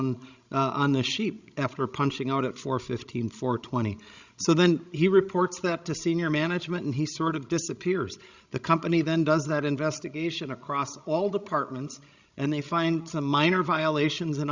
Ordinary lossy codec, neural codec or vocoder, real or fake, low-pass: Opus, 64 kbps; codec, 16 kHz, 16 kbps, FreqCodec, larger model; fake; 7.2 kHz